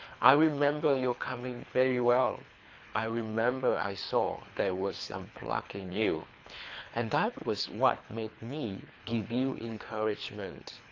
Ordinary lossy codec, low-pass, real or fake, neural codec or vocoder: AAC, 48 kbps; 7.2 kHz; fake; codec, 24 kHz, 3 kbps, HILCodec